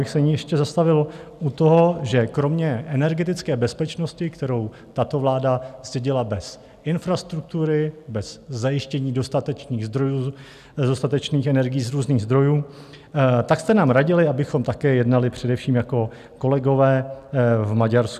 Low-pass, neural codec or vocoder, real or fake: 14.4 kHz; none; real